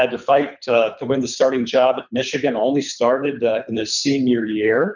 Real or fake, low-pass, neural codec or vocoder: fake; 7.2 kHz; codec, 24 kHz, 3 kbps, HILCodec